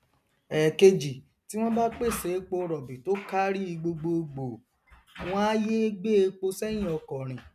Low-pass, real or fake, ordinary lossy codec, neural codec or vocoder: 14.4 kHz; real; none; none